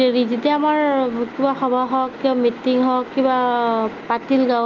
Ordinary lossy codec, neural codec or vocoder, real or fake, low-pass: Opus, 32 kbps; none; real; 7.2 kHz